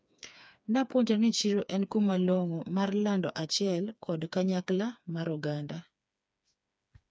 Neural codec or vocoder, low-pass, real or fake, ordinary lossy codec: codec, 16 kHz, 4 kbps, FreqCodec, smaller model; none; fake; none